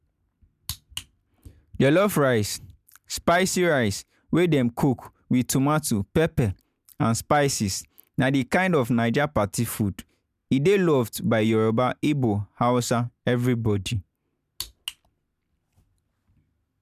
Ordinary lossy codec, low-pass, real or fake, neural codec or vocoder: none; 14.4 kHz; real; none